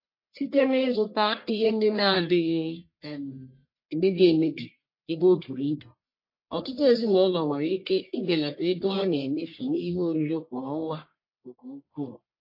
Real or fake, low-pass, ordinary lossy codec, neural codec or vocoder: fake; 5.4 kHz; MP3, 32 kbps; codec, 44.1 kHz, 1.7 kbps, Pupu-Codec